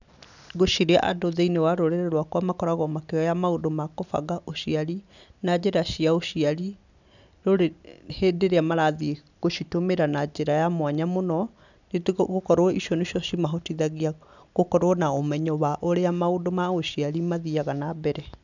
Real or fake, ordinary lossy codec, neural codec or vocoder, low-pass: real; none; none; 7.2 kHz